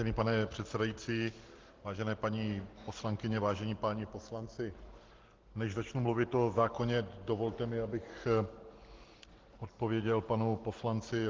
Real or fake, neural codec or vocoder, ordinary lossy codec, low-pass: real; none; Opus, 16 kbps; 7.2 kHz